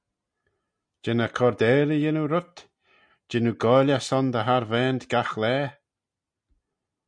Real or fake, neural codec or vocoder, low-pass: real; none; 9.9 kHz